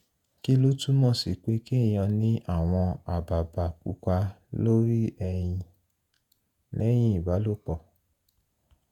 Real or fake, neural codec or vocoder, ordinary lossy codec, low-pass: fake; vocoder, 48 kHz, 128 mel bands, Vocos; none; 19.8 kHz